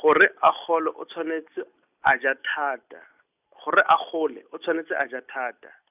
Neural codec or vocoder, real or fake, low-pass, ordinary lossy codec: none; real; 3.6 kHz; none